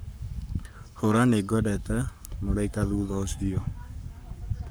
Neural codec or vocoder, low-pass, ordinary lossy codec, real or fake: codec, 44.1 kHz, 7.8 kbps, Pupu-Codec; none; none; fake